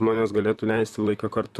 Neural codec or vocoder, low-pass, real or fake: vocoder, 44.1 kHz, 128 mel bands, Pupu-Vocoder; 14.4 kHz; fake